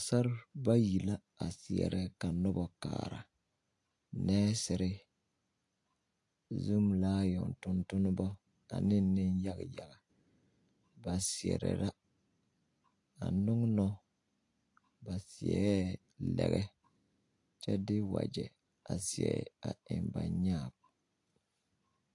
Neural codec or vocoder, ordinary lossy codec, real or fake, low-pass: none; AAC, 48 kbps; real; 10.8 kHz